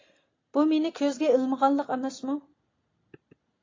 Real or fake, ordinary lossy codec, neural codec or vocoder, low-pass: real; AAC, 32 kbps; none; 7.2 kHz